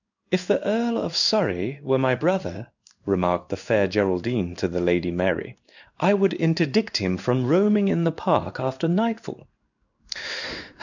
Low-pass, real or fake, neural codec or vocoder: 7.2 kHz; fake; codec, 16 kHz in and 24 kHz out, 1 kbps, XY-Tokenizer